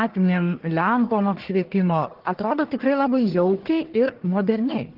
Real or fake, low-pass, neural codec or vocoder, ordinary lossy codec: fake; 5.4 kHz; codec, 44.1 kHz, 1.7 kbps, Pupu-Codec; Opus, 16 kbps